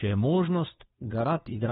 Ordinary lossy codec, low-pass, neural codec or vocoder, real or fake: AAC, 16 kbps; 7.2 kHz; codec, 16 kHz, 2 kbps, FunCodec, trained on Chinese and English, 25 frames a second; fake